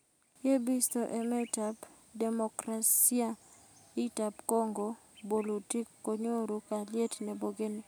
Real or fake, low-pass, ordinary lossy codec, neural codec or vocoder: real; none; none; none